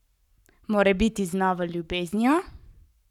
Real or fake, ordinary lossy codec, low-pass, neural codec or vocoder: fake; none; 19.8 kHz; codec, 44.1 kHz, 7.8 kbps, Pupu-Codec